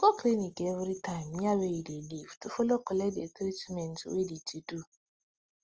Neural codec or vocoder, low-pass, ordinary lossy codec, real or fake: none; 7.2 kHz; Opus, 24 kbps; real